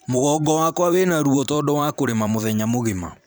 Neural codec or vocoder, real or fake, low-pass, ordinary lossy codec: vocoder, 44.1 kHz, 128 mel bands every 512 samples, BigVGAN v2; fake; none; none